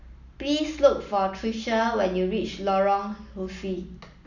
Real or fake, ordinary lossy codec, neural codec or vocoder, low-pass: real; none; none; 7.2 kHz